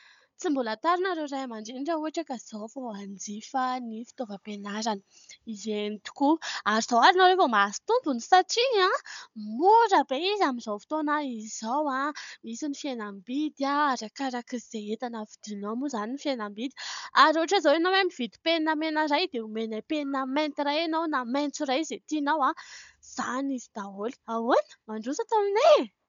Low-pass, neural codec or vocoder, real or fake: 7.2 kHz; codec, 16 kHz, 16 kbps, FunCodec, trained on Chinese and English, 50 frames a second; fake